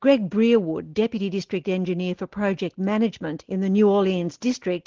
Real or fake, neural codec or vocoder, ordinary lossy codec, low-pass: real; none; Opus, 16 kbps; 7.2 kHz